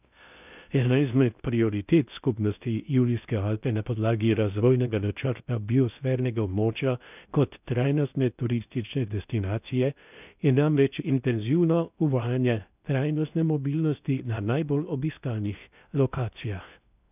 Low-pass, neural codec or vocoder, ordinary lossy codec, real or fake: 3.6 kHz; codec, 16 kHz in and 24 kHz out, 0.6 kbps, FocalCodec, streaming, 2048 codes; none; fake